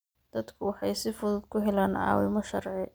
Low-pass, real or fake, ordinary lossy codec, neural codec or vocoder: none; real; none; none